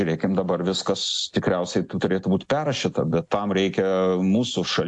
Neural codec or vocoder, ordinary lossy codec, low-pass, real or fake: none; AAC, 64 kbps; 10.8 kHz; real